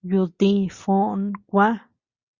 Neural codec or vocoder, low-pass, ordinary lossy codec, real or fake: none; 7.2 kHz; Opus, 64 kbps; real